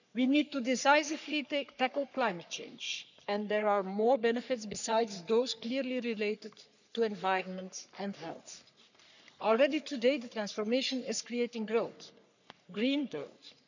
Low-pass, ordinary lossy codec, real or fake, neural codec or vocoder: 7.2 kHz; none; fake; codec, 44.1 kHz, 3.4 kbps, Pupu-Codec